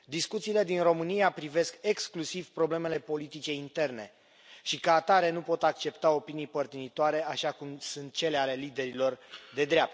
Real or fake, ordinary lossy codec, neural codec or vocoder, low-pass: real; none; none; none